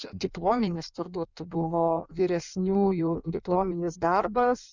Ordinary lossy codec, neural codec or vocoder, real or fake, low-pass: Opus, 64 kbps; codec, 16 kHz in and 24 kHz out, 1.1 kbps, FireRedTTS-2 codec; fake; 7.2 kHz